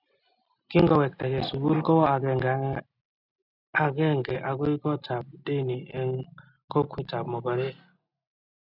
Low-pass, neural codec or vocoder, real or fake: 5.4 kHz; none; real